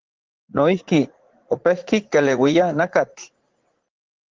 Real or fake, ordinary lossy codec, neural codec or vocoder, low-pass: fake; Opus, 16 kbps; vocoder, 44.1 kHz, 80 mel bands, Vocos; 7.2 kHz